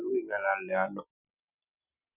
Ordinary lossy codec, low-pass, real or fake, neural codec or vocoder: none; 3.6 kHz; real; none